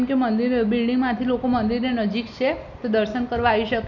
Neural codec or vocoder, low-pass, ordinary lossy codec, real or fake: none; 7.2 kHz; none; real